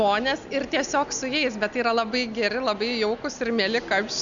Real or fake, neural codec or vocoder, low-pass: real; none; 7.2 kHz